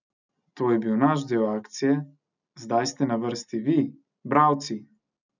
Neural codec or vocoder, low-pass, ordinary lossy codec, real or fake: none; 7.2 kHz; none; real